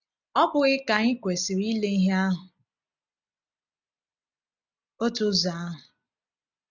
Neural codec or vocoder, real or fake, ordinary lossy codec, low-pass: none; real; none; 7.2 kHz